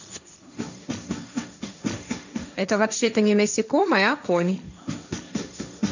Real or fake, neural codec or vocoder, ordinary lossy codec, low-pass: fake; codec, 16 kHz, 1.1 kbps, Voila-Tokenizer; none; 7.2 kHz